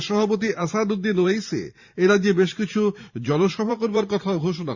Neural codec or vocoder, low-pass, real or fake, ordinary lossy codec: vocoder, 44.1 kHz, 128 mel bands every 512 samples, BigVGAN v2; 7.2 kHz; fake; Opus, 64 kbps